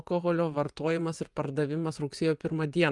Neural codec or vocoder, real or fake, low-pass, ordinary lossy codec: vocoder, 24 kHz, 100 mel bands, Vocos; fake; 10.8 kHz; Opus, 32 kbps